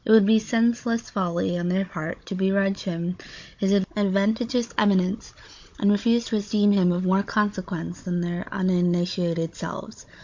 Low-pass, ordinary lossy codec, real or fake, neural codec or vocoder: 7.2 kHz; MP3, 48 kbps; fake; codec, 16 kHz, 16 kbps, FunCodec, trained on Chinese and English, 50 frames a second